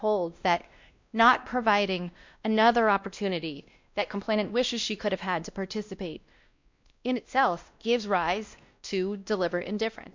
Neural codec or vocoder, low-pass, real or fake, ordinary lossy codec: codec, 16 kHz, 1 kbps, X-Codec, WavLM features, trained on Multilingual LibriSpeech; 7.2 kHz; fake; MP3, 64 kbps